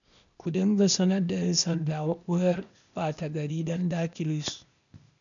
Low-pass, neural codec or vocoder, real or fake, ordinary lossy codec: 7.2 kHz; codec, 16 kHz, 0.8 kbps, ZipCodec; fake; none